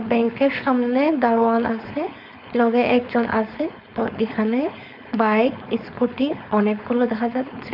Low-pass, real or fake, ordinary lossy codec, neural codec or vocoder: 5.4 kHz; fake; none; codec, 16 kHz, 4.8 kbps, FACodec